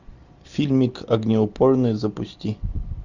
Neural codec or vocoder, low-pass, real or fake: none; 7.2 kHz; real